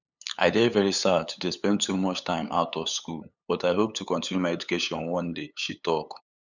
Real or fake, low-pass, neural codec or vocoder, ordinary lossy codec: fake; 7.2 kHz; codec, 16 kHz, 8 kbps, FunCodec, trained on LibriTTS, 25 frames a second; none